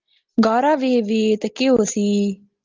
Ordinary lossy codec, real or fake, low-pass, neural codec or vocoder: Opus, 32 kbps; real; 7.2 kHz; none